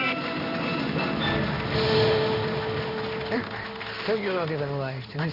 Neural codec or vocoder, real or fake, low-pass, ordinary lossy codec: codec, 16 kHz in and 24 kHz out, 1 kbps, XY-Tokenizer; fake; 5.4 kHz; none